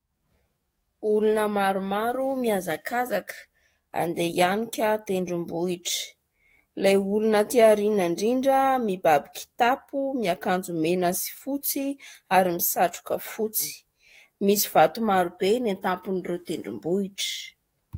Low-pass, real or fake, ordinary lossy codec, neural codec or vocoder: 19.8 kHz; fake; AAC, 48 kbps; codec, 44.1 kHz, 7.8 kbps, DAC